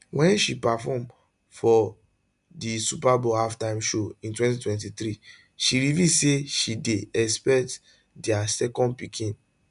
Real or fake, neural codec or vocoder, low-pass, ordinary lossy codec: real; none; 10.8 kHz; none